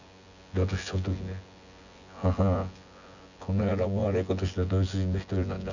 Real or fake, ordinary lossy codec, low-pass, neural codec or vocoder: fake; none; 7.2 kHz; vocoder, 24 kHz, 100 mel bands, Vocos